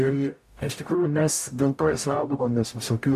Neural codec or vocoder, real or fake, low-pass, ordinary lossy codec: codec, 44.1 kHz, 0.9 kbps, DAC; fake; 14.4 kHz; AAC, 96 kbps